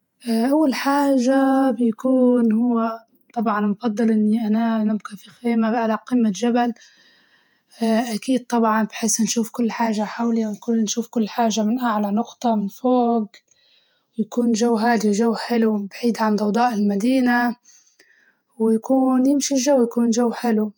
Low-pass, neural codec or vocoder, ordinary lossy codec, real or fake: 19.8 kHz; vocoder, 48 kHz, 128 mel bands, Vocos; none; fake